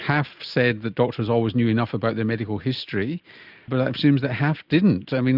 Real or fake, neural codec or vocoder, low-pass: real; none; 5.4 kHz